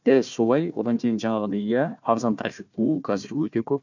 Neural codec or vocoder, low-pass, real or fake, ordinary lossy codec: codec, 16 kHz, 1 kbps, FunCodec, trained on Chinese and English, 50 frames a second; 7.2 kHz; fake; none